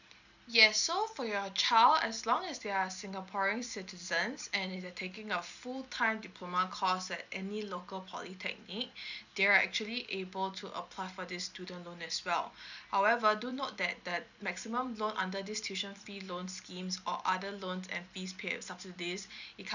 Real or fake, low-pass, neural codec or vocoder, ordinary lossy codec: real; 7.2 kHz; none; none